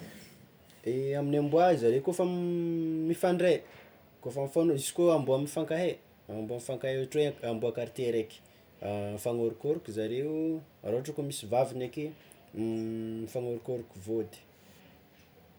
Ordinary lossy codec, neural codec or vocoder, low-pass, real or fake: none; none; none; real